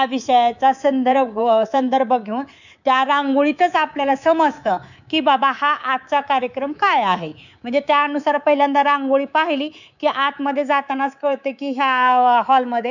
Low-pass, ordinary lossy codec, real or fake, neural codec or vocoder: 7.2 kHz; none; fake; codec, 24 kHz, 3.1 kbps, DualCodec